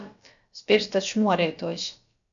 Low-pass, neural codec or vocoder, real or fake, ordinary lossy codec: 7.2 kHz; codec, 16 kHz, about 1 kbps, DyCAST, with the encoder's durations; fake; MP3, 96 kbps